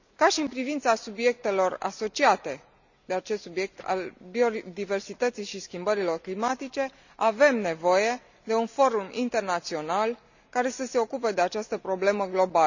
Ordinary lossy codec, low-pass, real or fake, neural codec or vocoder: none; 7.2 kHz; real; none